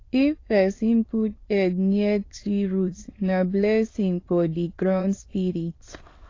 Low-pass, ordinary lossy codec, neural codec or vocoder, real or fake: 7.2 kHz; AAC, 32 kbps; autoencoder, 22.05 kHz, a latent of 192 numbers a frame, VITS, trained on many speakers; fake